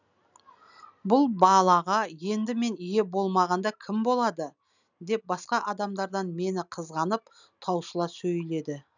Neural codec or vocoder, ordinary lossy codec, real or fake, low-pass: none; none; real; 7.2 kHz